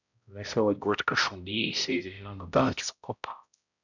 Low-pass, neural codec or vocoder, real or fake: 7.2 kHz; codec, 16 kHz, 0.5 kbps, X-Codec, HuBERT features, trained on balanced general audio; fake